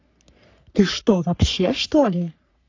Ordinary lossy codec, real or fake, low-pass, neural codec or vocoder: AAC, 48 kbps; fake; 7.2 kHz; codec, 44.1 kHz, 3.4 kbps, Pupu-Codec